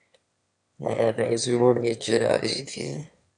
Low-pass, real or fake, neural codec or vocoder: 9.9 kHz; fake; autoencoder, 22.05 kHz, a latent of 192 numbers a frame, VITS, trained on one speaker